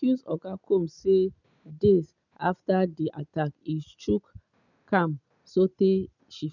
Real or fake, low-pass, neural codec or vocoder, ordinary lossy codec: real; 7.2 kHz; none; none